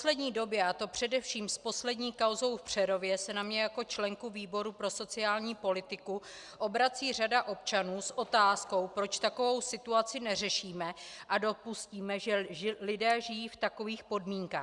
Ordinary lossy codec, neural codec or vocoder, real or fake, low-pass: Opus, 64 kbps; none; real; 10.8 kHz